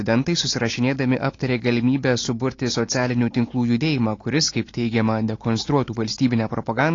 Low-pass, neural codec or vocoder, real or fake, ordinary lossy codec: 7.2 kHz; none; real; AAC, 32 kbps